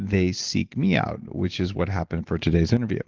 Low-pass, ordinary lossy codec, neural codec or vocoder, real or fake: 7.2 kHz; Opus, 16 kbps; none; real